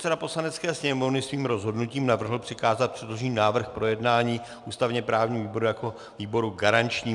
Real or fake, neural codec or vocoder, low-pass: real; none; 10.8 kHz